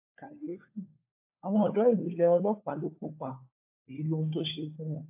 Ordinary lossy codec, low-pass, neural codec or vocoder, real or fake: AAC, 24 kbps; 3.6 kHz; codec, 16 kHz, 4 kbps, FunCodec, trained on LibriTTS, 50 frames a second; fake